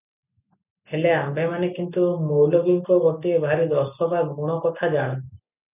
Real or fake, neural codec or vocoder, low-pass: real; none; 3.6 kHz